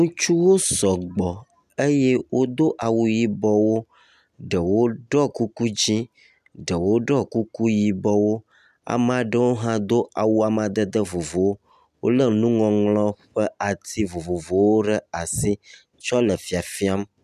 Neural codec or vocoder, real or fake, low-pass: none; real; 14.4 kHz